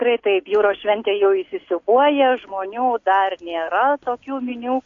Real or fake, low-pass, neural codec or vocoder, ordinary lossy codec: real; 7.2 kHz; none; AAC, 48 kbps